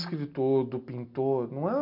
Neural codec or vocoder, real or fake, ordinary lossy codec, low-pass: none; real; none; 5.4 kHz